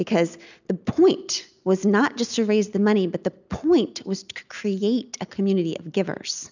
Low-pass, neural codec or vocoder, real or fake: 7.2 kHz; none; real